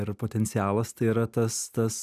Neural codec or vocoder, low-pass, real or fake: none; 14.4 kHz; real